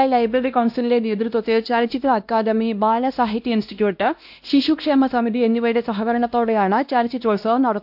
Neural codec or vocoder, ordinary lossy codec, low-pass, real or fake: codec, 16 kHz, 1 kbps, X-Codec, WavLM features, trained on Multilingual LibriSpeech; AAC, 48 kbps; 5.4 kHz; fake